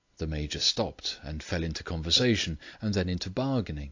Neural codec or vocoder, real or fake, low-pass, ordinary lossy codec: none; real; 7.2 kHz; AAC, 48 kbps